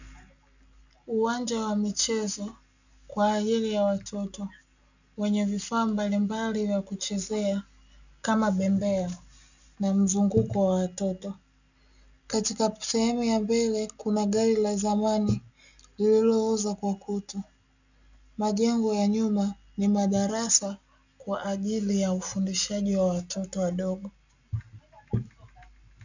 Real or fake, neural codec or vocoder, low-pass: real; none; 7.2 kHz